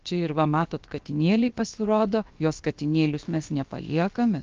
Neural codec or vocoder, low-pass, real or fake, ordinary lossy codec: codec, 16 kHz, about 1 kbps, DyCAST, with the encoder's durations; 7.2 kHz; fake; Opus, 24 kbps